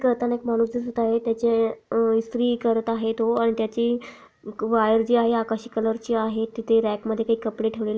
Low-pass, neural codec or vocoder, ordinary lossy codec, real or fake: none; none; none; real